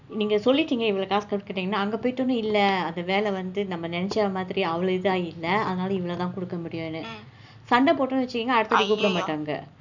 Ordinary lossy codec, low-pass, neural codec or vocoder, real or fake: none; 7.2 kHz; none; real